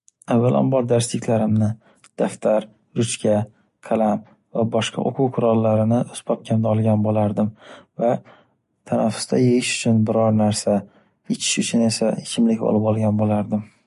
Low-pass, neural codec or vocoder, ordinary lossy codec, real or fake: 14.4 kHz; none; MP3, 48 kbps; real